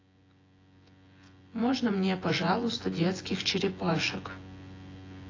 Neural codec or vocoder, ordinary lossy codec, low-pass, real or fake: vocoder, 24 kHz, 100 mel bands, Vocos; AAC, 32 kbps; 7.2 kHz; fake